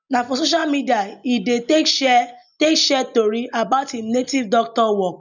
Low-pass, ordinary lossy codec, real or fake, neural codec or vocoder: 7.2 kHz; none; real; none